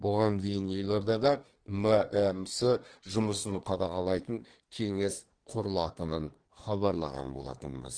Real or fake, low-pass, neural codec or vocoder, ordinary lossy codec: fake; 9.9 kHz; codec, 24 kHz, 1 kbps, SNAC; Opus, 16 kbps